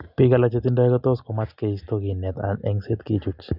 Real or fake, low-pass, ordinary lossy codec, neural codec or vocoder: real; 5.4 kHz; none; none